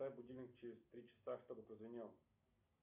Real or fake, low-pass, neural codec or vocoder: real; 3.6 kHz; none